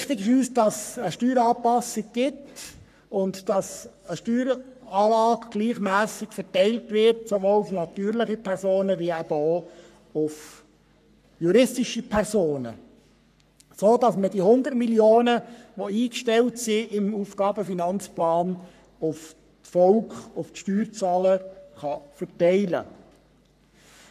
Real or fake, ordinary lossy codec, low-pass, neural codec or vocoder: fake; none; 14.4 kHz; codec, 44.1 kHz, 3.4 kbps, Pupu-Codec